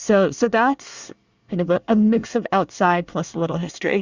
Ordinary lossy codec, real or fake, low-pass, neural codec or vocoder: Opus, 64 kbps; fake; 7.2 kHz; codec, 24 kHz, 1 kbps, SNAC